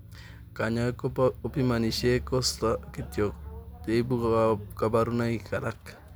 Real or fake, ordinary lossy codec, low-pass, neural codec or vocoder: real; none; none; none